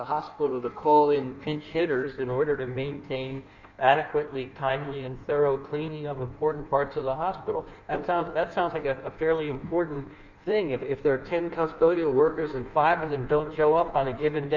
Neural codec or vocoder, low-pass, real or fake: codec, 16 kHz in and 24 kHz out, 1.1 kbps, FireRedTTS-2 codec; 7.2 kHz; fake